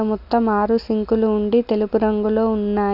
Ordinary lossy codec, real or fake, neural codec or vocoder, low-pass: none; real; none; 5.4 kHz